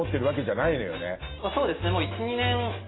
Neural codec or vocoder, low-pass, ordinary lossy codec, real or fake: none; 7.2 kHz; AAC, 16 kbps; real